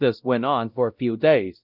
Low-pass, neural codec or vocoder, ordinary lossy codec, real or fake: 5.4 kHz; codec, 16 kHz, 0.5 kbps, X-Codec, WavLM features, trained on Multilingual LibriSpeech; Opus, 24 kbps; fake